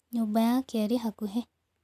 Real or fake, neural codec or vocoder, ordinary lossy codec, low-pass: real; none; none; 14.4 kHz